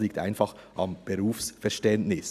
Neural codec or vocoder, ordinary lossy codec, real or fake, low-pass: none; none; real; 14.4 kHz